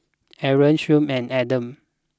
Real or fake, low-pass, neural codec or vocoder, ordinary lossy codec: real; none; none; none